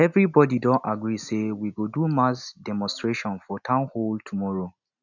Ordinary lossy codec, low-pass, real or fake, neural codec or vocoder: none; 7.2 kHz; real; none